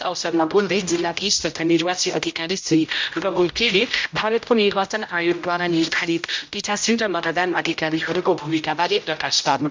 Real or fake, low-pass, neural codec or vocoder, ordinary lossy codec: fake; 7.2 kHz; codec, 16 kHz, 0.5 kbps, X-Codec, HuBERT features, trained on general audio; MP3, 64 kbps